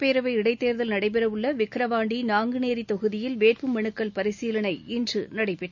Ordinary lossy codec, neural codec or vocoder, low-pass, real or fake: none; none; 7.2 kHz; real